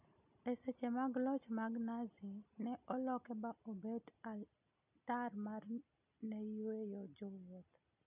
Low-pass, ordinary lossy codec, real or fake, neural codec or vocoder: 3.6 kHz; none; real; none